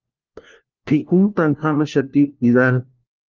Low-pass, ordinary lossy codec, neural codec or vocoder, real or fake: 7.2 kHz; Opus, 32 kbps; codec, 16 kHz, 1 kbps, FunCodec, trained on LibriTTS, 50 frames a second; fake